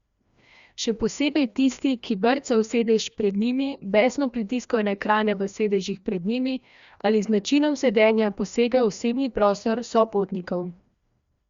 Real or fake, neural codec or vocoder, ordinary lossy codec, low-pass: fake; codec, 16 kHz, 1 kbps, FreqCodec, larger model; Opus, 64 kbps; 7.2 kHz